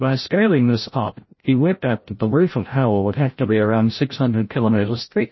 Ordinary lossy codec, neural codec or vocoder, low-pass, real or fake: MP3, 24 kbps; codec, 16 kHz, 0.5 kbps, FreqCodec, larger model; 7.2 kHz; fake